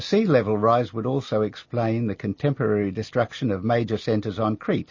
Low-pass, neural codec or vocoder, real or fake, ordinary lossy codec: 7.2 kHz; none; real; MP3, 32 kbps